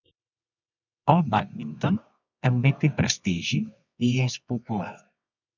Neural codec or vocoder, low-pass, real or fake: codec, 24 kHz, 0.9 kbps, WavTokenizer, medium music audio release; 7.2 kHz; fake